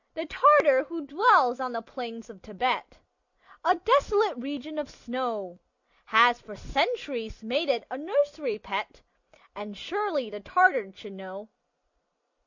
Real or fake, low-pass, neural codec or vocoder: real; 7.2 kHz; none